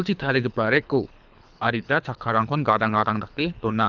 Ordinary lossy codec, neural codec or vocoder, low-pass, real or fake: none; codec, 24 kHz, 3 kbps, HILCodec; 7.2 kHz; fake